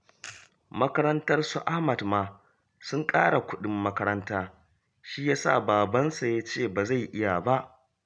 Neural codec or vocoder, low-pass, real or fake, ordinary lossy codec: none; 9.9 kHz; real; none